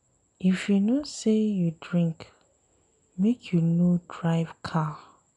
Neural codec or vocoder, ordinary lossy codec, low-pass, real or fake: none; none; 10.8 kHz; real